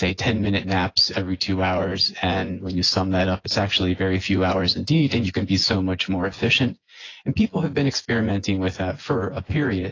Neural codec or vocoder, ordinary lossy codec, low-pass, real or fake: vocoder, 24 kHz, 100 mel bands, Vocos; AAC, 32 kbps; 7.2 kHz; fake